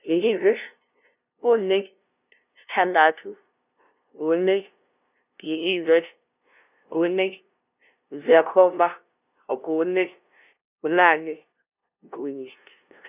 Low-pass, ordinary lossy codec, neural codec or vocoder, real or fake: 3.6 kHz; none; codec, 16 kHz, 0.5 kbps, FunCodec, trained on LibriTTS, 25 frames a second; fake